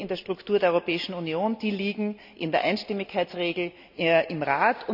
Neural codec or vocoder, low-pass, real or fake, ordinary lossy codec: none; 5.4 kHz; real; none